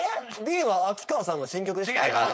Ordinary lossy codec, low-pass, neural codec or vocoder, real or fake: none; none; codec, 16 kHz, 4.8 kbps, FACodec; fake